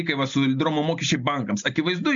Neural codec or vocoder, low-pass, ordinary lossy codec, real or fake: none; 7.2 kHz; MP3, 64 kbps; real